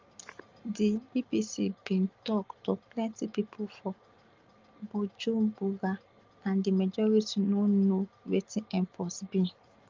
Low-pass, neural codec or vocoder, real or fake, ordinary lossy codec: 7.2 kHz; none; real; Opus, 32 kbps